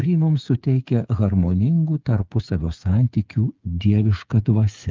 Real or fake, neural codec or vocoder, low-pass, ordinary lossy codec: fake; codec, 16 kHz, 8 kbps, FreqCodec, smaller model; 7.2 kHz; Opus, 32 kbps